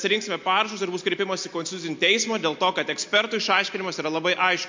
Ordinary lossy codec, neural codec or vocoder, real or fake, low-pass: MP3, 48 kbps; none; real; 7.2 kHz